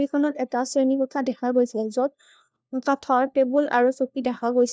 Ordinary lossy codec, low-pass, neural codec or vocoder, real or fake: none; none; codec, 16 kHz, 1 kbps, FunCodec, trained on LibriTTS, 50 frames a second; fake